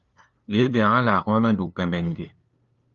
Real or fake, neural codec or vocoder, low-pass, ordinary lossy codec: fake; codec, 16 kHz, 2 kbps, FunCodec, trained on LibriTTS, 25 frames a second; 7.2 kHz; Opus, 32 kbps